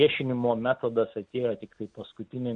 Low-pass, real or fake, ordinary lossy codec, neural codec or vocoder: 9.9 kHz; real; AAC, 64 kbps; none